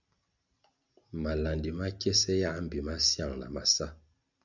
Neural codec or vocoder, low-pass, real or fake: none; 7.2 kHz; real